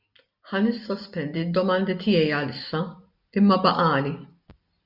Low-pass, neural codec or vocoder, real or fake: 5.4 kHz; none; real